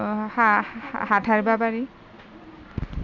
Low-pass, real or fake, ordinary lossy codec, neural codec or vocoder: 7.2 kHz; real; none; none